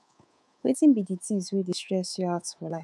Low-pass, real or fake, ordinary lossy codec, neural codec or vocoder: none; fake; none; codec, 24 kHz, 3.1 kbps, DualCodec